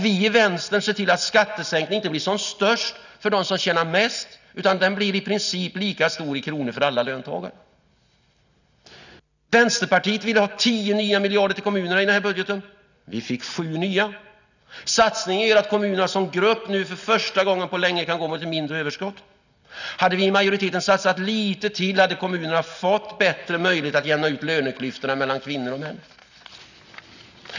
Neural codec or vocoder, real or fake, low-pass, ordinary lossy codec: none; real; 7.2 kHz; none